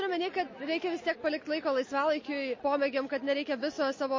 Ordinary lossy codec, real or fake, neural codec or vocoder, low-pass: MP3, 32 kbps; real; none; 7.2 kHz